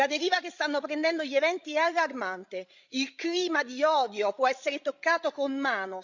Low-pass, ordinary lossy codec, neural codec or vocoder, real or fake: 7.2 kHz; Opus, 64 kbps; codec, 16 kHz, 16 kbps, FreqCodec, larger model; fake